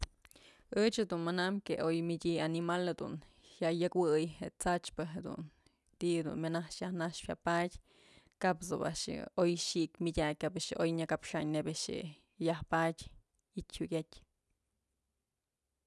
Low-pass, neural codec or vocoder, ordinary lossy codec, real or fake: none; none; none; real